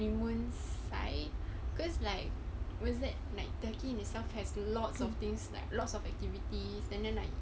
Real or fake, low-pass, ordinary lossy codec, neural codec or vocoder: real; none; none; none